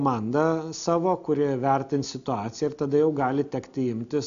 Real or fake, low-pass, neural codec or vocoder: real; 7.2 kHz; none